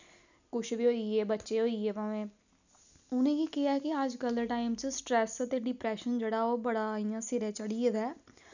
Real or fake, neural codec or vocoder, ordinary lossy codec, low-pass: real; none; none; 7.2 kHz